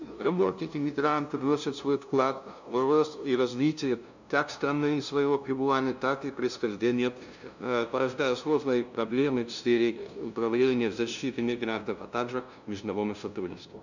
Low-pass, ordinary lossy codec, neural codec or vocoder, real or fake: 7.2 kHz; none; codec, 16 kHz, 0.5 kbps, FunCodec, trained on LibriTTS, 25 frames a second; fake